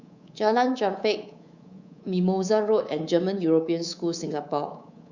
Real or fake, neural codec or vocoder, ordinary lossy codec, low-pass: fake; codec, 24 kHz, 3.1 kbps, DualCodec; Opus, 64 kbps; 7.2 kHz